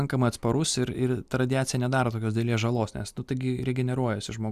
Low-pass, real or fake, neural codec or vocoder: 14.4 kHz; real; none